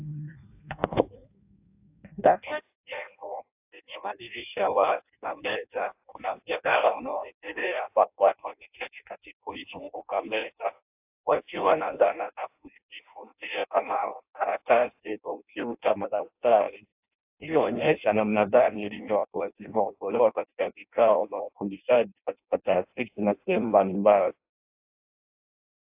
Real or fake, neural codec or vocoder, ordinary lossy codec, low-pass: fake; codec, 16 kHz in and 24 kHz out, 0.6 kbps, FireRedTTS-2 codec; AAC, 32 kbps; 3.6 kHz